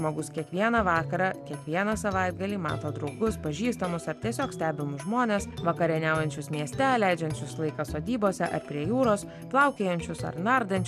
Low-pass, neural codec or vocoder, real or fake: 14.4 kHz; none; real